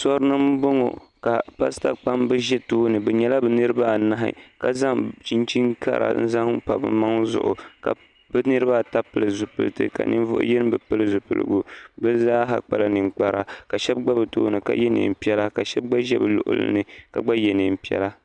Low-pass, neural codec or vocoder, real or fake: 10.8 kHz; none; real